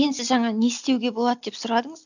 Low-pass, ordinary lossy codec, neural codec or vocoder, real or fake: 7.2 kHz; none; none; real